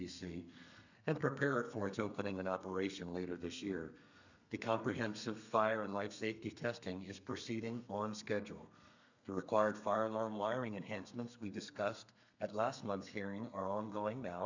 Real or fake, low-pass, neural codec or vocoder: fake; 7.2 kHz; codec, 32 kHz, 1.9 kbps, SNAC